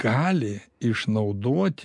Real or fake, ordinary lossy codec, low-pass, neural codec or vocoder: real; MP3, 64 kbps; 10.8 kHz; none